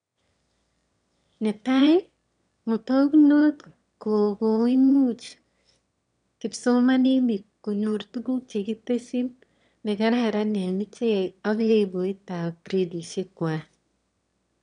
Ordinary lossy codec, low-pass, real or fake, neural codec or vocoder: none; 9.9 kHz; fake; autoencoder, 22.05 kHz, a latent of 192 numbers a frame, VITS, trained on one speaker